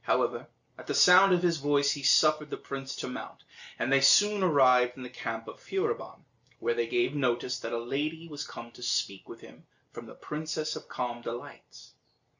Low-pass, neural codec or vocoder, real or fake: 7.2 kHz; none; real